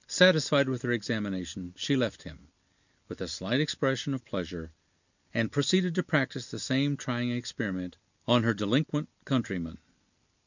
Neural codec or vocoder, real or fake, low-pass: none; real; 7.2 kHz